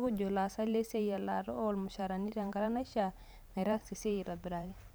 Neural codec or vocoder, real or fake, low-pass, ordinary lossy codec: vocoder, 44.1 kHz, 128 mel bands every 512 samples, BigVGAN v2; fake; none; none